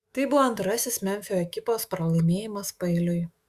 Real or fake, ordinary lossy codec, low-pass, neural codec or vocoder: fake; Opus, 64 kbps; 14.4 kHz; autoencoder, 48 kHz, 128 numbers a frame, DAC-VAE, trained on Japanese speech